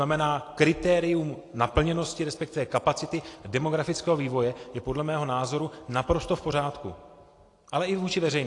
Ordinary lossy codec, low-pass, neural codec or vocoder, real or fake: AAC, 48 kbps; 10.8 kHz; none; real